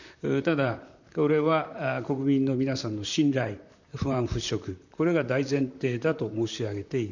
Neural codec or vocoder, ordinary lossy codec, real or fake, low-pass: vocoder, 44.1 kHz, 128 mel bands, Pupu-Vocoder; none; fake; 7.2 kHz